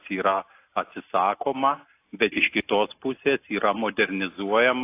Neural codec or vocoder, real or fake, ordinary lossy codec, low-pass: none; real; AAC, 24 kbps; 3.6 kHz